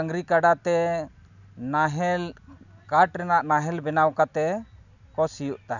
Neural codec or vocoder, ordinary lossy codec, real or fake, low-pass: none; none; real; 7.2 kHz